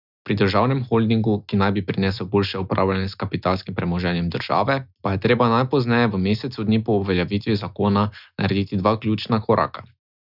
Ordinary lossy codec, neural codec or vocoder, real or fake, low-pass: none; none; real; 5.4 kHz